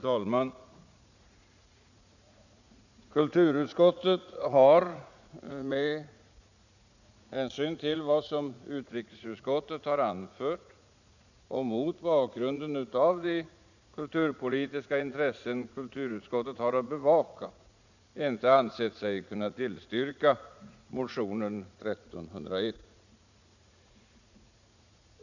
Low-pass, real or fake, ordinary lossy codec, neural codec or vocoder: 7.2 kHz; fake; none; vocoder, 44.1 kHz, 80 mel bands, Vocos